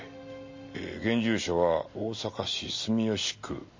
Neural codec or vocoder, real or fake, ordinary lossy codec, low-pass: none; real; MP3, 32 kbps; 7.2 kHz